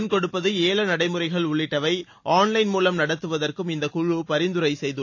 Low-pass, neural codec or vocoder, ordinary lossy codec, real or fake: 7.2 kHz; none; AAC, 48 kbps; real